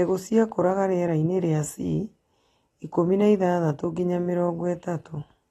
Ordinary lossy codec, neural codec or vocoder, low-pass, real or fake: AAC, 32 kbps; none; 19.8 kHz; real